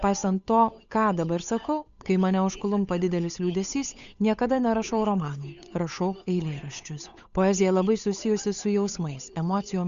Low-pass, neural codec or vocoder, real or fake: 7.2 kHz; codec, 16 kHz, 8 kbps, FunCodec, trained on Chinese and English, 25 frames a second; fake